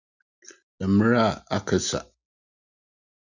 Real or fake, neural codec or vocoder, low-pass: real; none; 7.2 kHz